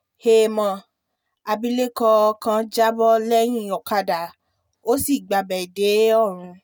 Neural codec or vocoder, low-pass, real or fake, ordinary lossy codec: none; none; real; none